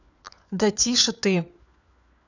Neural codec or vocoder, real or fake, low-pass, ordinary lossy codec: codec, 16 kHz, 4 kbps, FunCodec, trained on LibriTTS, 50 frames a second; fake; 7.2 kHz; none